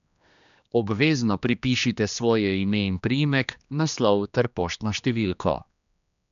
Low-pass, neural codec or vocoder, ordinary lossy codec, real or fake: 7.2 kHz; codec, 16 kHz, 2 kbps, X-Codec, HuBERT features, trained on general audio; none; fake